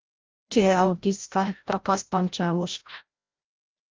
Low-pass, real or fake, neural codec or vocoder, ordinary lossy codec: 7.2 kHz; fake; codec, 16 kHz, 0.5 kbps, FreqCodec, larger model; Opus, 24 kbps